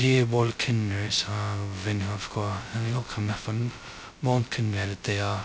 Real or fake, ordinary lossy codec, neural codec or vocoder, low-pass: fake; none; codec, 16 kHz, 0.2 kbps, FocalCodec; none